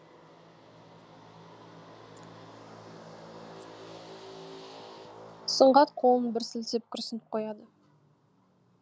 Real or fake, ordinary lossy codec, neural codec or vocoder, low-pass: real; none; none; none